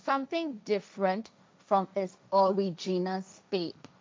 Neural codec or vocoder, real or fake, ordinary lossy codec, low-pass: codec, 16 kHz, 1.1 kbps, Voila-Tokenizer; fake; none; none